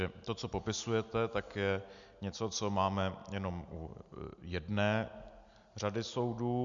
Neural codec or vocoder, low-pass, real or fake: none; 7.2 kHz; real